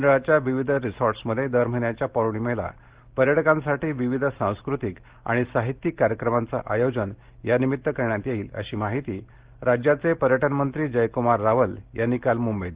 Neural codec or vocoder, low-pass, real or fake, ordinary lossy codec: none; 3.6 kHz; real; Opus, 16 kbps